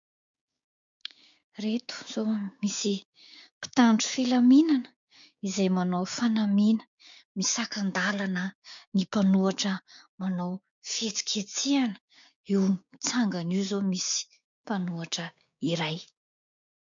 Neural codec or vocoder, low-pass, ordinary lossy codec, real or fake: codec, 16 kHz, 6 kbps, DAC; 7.2 kHz; MP3, 64 kbps; fake